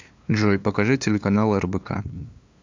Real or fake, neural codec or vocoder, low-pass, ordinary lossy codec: fake; codec, 16 kHz, 2 kbps, FunCodec, trained on LibriTTS, 25 frames a second; 7.2 kHz; MP3, 64 kbps